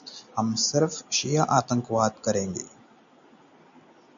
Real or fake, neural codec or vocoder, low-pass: real; none; 7.2 kHz